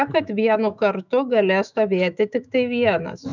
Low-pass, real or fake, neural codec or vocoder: 7.2 kHz; fake; autoencoder, 48 kHz, 128 numbers a frame, DAC-VAE, trained on Japanese speech